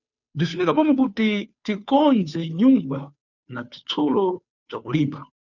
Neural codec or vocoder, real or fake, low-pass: codec, 16 kHz, 2 kbps, FunCodec, trained on Chinese and English, 25 frames a second; fake; 7.2 kHz